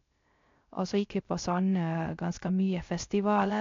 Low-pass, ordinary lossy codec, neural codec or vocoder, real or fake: 7.2 kHz; AAC, 48 kbps; codec, 16 kHz, 0.3 kbps, FocalCodec; fake